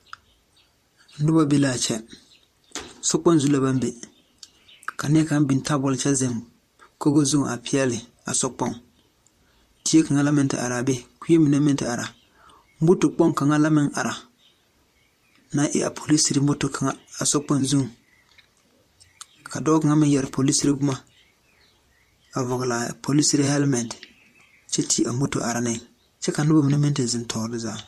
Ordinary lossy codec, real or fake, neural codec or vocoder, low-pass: MP3, 64 kbps; fake; vocoder, 44.1 kHz, 128 mel bands, Pupu-Vocoder; 14.4 kHz